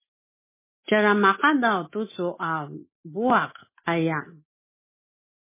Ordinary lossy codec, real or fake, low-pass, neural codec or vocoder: MP3, 16 kbps; real; 3.6 kHz; none